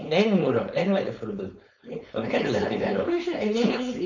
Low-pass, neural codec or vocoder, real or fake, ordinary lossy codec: 7.2 kHz; codec, 16 kHz, 4.8 kbps, FACodec; fake; none